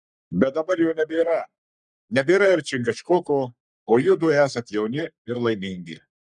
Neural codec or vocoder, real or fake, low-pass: codec, 44.1 kHz, 3.4 kbps, Pupu-Codec; fake; 10.8 kHz